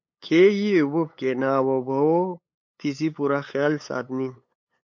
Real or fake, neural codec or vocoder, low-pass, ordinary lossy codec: fake; codec, 16 kHz, 8 kbps, FunCodec, trained on LibriTTS, 25 frames a second; 7.2 kHz; MP3, 48 kbps